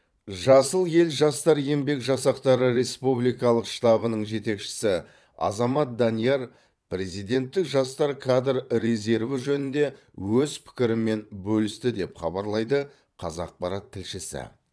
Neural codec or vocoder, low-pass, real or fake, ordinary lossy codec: vocoder, 22.05 kHz, 80 mel bands, Vocos; none; fake; none